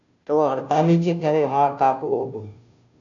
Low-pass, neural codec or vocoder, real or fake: 7.2 kHz; codec, 16 kHz, 0.5 kbps, FunCodec, trained on Chinese and English, 25 frames a second; fake